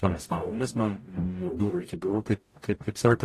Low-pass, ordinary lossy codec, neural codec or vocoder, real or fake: 14.4 kHz; AAC, 48 kbps; codec, 44.1 kHz, 0.9 kbps, DAC; fake